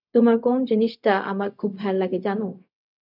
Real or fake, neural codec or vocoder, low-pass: fake; codec, 16 kHz, 0.4 kbps, LongCat-Audio-Codec; 5.4 kHz